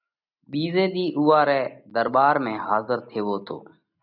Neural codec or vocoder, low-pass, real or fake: none; 5.4 kHz; real